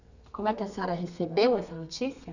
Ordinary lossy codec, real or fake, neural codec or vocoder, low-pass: none; fake; codec, 32 kHz, 1.9 kbps, SNAC; 7.2 kHz